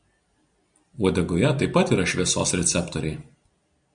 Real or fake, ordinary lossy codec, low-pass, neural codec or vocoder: real; Opus, 64 kbps; 9.9 kHz; none